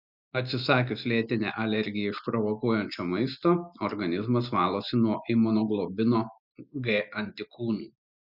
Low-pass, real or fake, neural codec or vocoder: 5.4 kHz; real; none